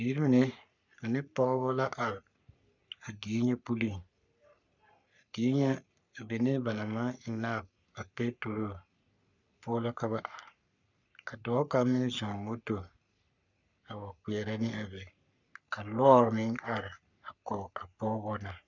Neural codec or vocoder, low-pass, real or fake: codec, 44.1 kHz, 3.4 kbps, Pupu-Codec; 7.2 kHz; fake